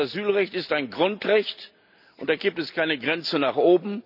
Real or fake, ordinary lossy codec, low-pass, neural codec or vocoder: real; none; 5.4 kHz; none